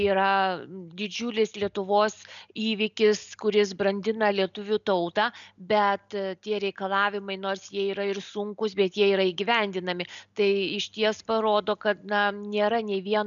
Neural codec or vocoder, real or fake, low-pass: none; real; 7.2 kHz